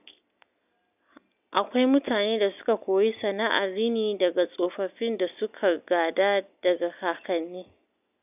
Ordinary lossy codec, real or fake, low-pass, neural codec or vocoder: none; real; 3.6 kHz; none